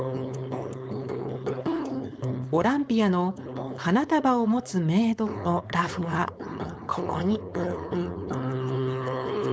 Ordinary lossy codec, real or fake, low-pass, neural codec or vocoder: none; fake; none; codec, 16 kHz, 4.8 kbps, FACodec